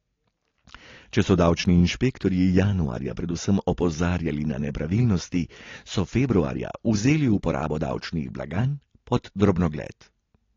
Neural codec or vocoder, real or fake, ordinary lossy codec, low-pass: none; real; AAC, 32 kbps; 7.2 kHz